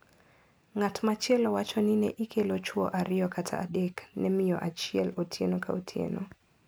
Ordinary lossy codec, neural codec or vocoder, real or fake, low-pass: none; none; real; none